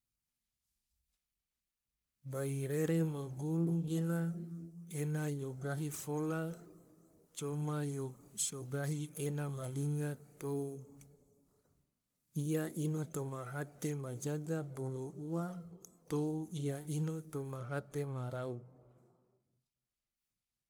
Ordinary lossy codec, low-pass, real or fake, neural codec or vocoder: none; none; fake; codec, 44.1 kHz, 1.7 kbps, Pupu-Codec